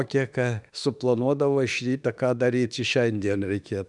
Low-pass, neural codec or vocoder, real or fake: 10.8 kHz; autoencoder, 48 kHz, 32 numbers a frame, DAC-VAE, trained on Japanese speech; fake